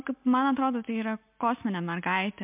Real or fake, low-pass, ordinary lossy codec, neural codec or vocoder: real; 3.6 kHz; MP3, 32 kbps; none